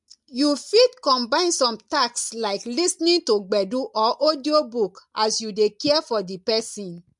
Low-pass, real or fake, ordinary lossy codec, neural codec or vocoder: 10.8 kHz; real; MP3, 64 kbps; none